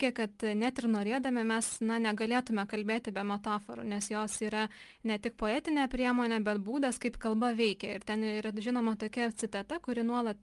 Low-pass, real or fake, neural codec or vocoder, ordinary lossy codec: 10.8 kHz; real; none; Opus, 32 kbps